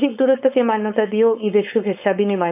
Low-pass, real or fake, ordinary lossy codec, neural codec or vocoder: 3.6 kHz; fake; none; codec, 16 kHz, 4.8 kbps, FACodec